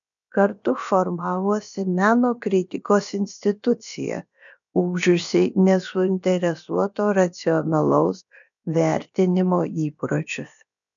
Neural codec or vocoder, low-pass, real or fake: codec, 16 kHz, about 1 kbps, DyCAST, with the encoder's durations; 7.2 kHz; fake